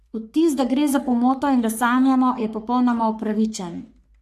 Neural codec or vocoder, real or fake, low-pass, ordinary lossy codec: codec, 44.1 kHz, 3.4 kbps, Pupu-Codec; fake; 14.4 kHz; none